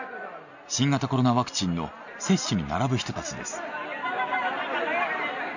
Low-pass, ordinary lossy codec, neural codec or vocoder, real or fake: 7.2 kHz; none; none; real